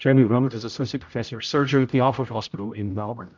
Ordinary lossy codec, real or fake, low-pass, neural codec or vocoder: MP3, 64 kbps; fake; 7.2 kHz; codec, 16 kHz, 0.5 kbps, X-Codec, HuBERT features, trained on general audio